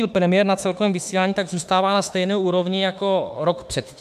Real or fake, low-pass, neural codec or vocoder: fake; 14.4 kHz; autoencoder, 48 kHz, 32 numbers a frame, DAC-VAE, trained on Japanese speech